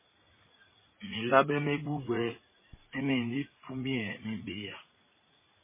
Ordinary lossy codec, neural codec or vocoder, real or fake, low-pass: MP3, 16 kbps; vocoder, 44.1 kHz, 80 mel bands, Vocos; fake; 3.6 kHz